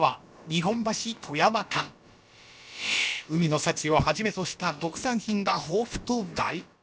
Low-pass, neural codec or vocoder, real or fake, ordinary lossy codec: none; codec, 16 kHz, about 1 kbps, DyCAST, with the encoder's durations; fake; none